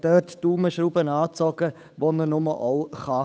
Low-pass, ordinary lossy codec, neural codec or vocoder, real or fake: none; none; codec, 16 kHz, 8 kbps, FunCodec, trained on Chinese and English, 25 frames a second; fake